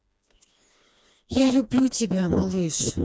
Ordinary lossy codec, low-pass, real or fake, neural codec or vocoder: none; none; fake; codec, 16 kHz, 2 kbps, FreqCodec, smaller model